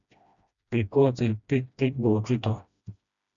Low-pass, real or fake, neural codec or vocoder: 7.2 kHz; fake; codec, 16 kHz, 1 kbps, FreqCodec, smaller model